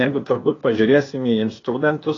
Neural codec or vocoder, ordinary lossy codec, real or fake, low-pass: codec, 16 kHz, 0.8 kbps, ZipCodec; AAC, 32 kbps; fake; 7.2 kHz